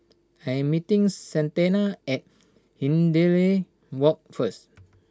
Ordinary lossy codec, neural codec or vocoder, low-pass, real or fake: none; none; none; real